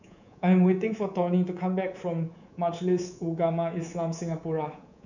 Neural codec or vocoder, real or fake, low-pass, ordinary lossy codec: codec, 24 kHz, 3.1 kbps, DualCodec; fake; 7.2 kHz; none